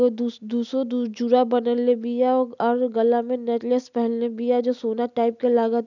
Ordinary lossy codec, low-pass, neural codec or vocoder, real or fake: none; 7.2 kHz; none; real